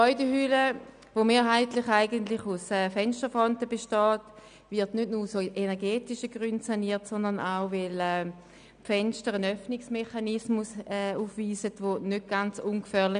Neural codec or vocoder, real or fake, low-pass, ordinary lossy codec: none; real; 9.9 kHz; none